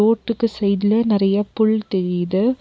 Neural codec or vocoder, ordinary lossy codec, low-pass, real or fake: none; none; none; real